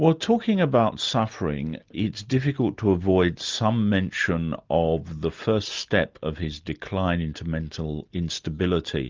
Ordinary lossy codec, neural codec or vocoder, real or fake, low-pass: Opus, 32 kbps; none; real; 7.2 kHz